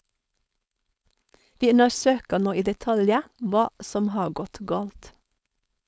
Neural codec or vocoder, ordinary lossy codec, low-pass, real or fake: codec, 16 kHz, 4.8 kbps, FACodec; none; none; fake